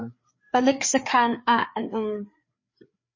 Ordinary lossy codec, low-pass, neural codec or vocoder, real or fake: MP3, 32 kbps; 7.2 kHz; codec, 16 kHz, 4 kbps, FreqCodec, larger model; fake